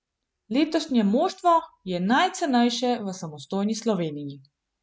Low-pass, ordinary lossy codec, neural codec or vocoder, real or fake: none; none; none; real